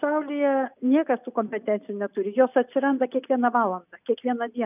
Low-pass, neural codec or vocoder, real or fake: 3.6 kHz; none; real